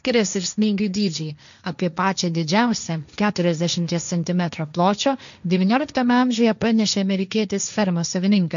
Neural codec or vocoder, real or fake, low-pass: codec, 16 kHz, 1.1 kbps, Voila-Tokenizer; fake; 7.2 kHz